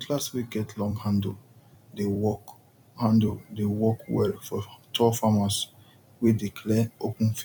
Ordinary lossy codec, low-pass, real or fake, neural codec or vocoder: none; 19.8 kHz; fake; vocoder, 44.1 kHz, 128 mel bands every 256 samples, BigVGAN v2